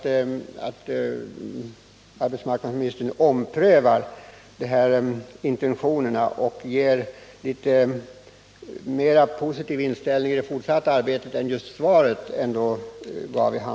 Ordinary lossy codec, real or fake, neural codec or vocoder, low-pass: none; real; none; none